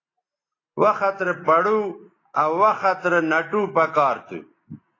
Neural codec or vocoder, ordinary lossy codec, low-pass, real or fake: none; AAC, 32 kbps; 7.2 kHz; real